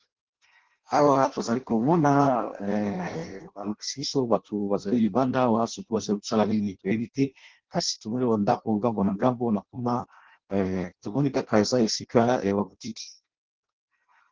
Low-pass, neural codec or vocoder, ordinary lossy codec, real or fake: 7.2 kHz; codec, 16 kHz in and 24 kHz out, 0.6 kbps, FireRedTTS-2 codec; Opus, 16 kbps; fake